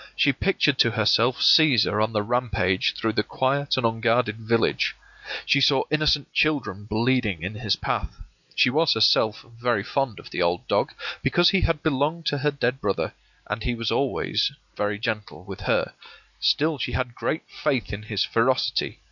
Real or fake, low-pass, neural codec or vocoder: real; 7.2 kHz; none